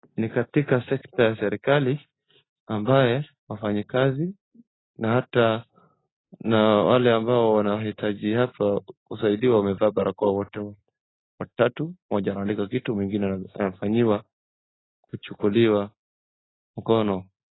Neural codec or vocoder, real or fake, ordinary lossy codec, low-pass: none; real; AAC, 16 kbps; 7.2 kHz